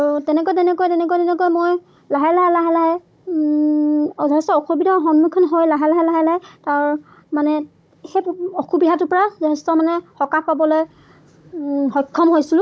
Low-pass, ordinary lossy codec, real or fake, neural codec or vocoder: none; none; fake; codec, 16 kHz, 16 kbps, FunCodec, trained on Chinese and English, 50 frames a second